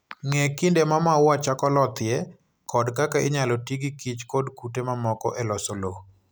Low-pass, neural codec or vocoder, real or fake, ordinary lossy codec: none; none; real; none